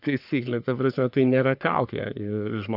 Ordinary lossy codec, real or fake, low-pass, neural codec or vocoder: AAC, 48 kbps; fake; 5.4 kHz; codec, 44.1 kHz, 3.4 kbps, Pupu-Codec